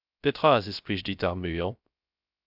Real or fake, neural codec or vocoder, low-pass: fake; codec, 16 kHz, 0.3 kbps, FocalCodec; 5.4 kHz